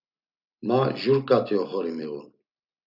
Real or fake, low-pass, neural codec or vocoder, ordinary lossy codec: real; 5.4 kHz; none; AAC, 48 kbps